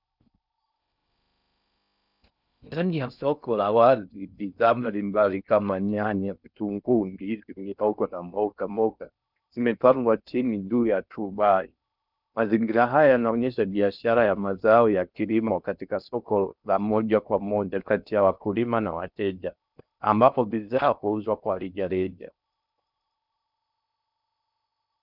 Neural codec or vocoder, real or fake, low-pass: codec, 16 kHz in and 24 kHz out, 0.6 kbps, FocalCodec, streaming, 4096 codes; fake; 5.4 kHz